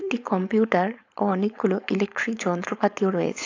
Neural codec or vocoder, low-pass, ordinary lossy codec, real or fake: codec, 16 kHz, 4.8 kbps, FACodec; 7.2 kHz; AAC, 48 kbps; fake